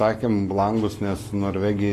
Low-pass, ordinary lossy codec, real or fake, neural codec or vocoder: 14.4 kHz; AAC, 48 kbps; fake; codec, 44.1 kHz, 7.8 kbps, Pupu-Codec